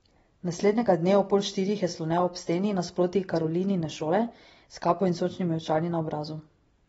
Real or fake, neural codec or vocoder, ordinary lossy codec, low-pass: real; none; AAC, 24 kbps; 10.8 kHz